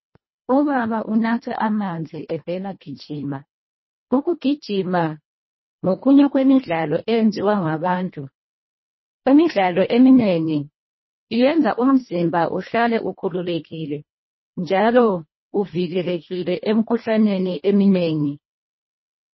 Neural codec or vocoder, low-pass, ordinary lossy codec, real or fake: codec, 24 kHz, 1.5 kbps, HILCodec; 7.2 kHz; MP3, 24 kbps; fake